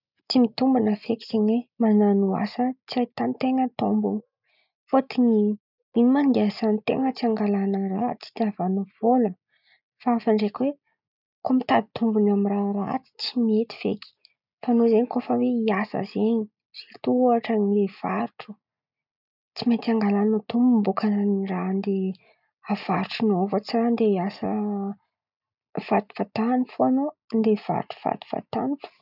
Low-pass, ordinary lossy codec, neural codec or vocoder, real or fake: 5.4 kHz; none; none; real